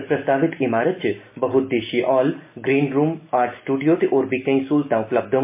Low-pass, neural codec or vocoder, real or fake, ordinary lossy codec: 3.6 kHz; none; real; MP3, 24 kbps